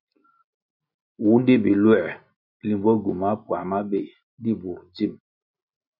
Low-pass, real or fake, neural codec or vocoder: 5.4 kHz; real; none